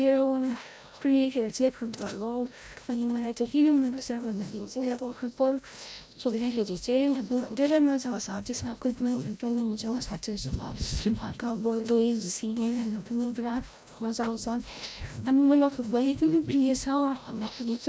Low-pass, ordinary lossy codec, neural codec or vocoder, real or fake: none; none; codec, 16 kHz, 0.5 kbps, FreqCodec, larger model; fake